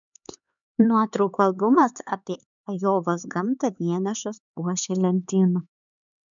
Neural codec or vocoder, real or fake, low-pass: codec, 16 kHz, 4 kbps, X-Codec, HuBERT features, trained on LibriSpeech; fake; 7.2 kHz